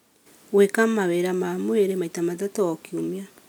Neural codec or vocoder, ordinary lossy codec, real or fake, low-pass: none; none; real; none